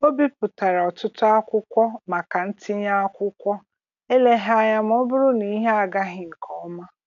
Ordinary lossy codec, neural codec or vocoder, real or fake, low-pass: none; none; real; 7.2 kHz